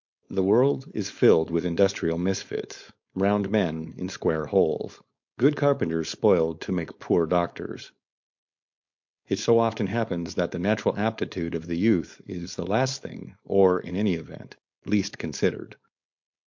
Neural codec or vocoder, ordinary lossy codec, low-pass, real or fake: codec, 16 kHz, 4.8 kbps, FACodec; MP3, 48 kbps; 7.2 kHz; fake